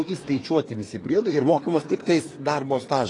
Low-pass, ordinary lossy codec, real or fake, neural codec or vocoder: 10.8 kHz; AAC, 32 kbps; fake; codec, 24 kHz, 1 kbps, SNAC